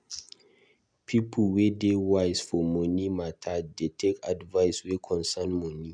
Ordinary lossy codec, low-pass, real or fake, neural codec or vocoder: MP3, 96 kbps; 9.9 kHz; real; none